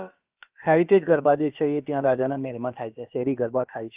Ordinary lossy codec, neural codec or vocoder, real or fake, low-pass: Opus, 24 kbps; codec, 16 kHz, about 1 kbps, DyCAST, with the encoder's durations; fake; 3.6 kHz